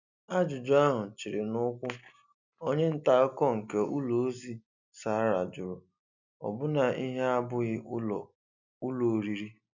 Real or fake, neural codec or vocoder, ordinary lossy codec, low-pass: real; none; none; 7.2 kHz